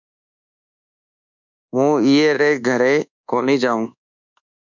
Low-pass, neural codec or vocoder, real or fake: 7.2 kHz; codec, 24 kHz, 1.2 kbps, DualCodec; fake